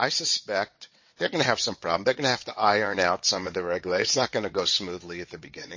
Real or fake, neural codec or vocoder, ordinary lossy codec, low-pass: fake; vocoder, 22.05 kHz, 80 mel bands, WaveNeXt; MP3, 32 kbps; 7.2 kHz